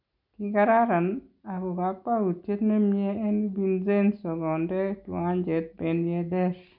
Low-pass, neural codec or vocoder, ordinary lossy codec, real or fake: 5.4 kHz; none; none; real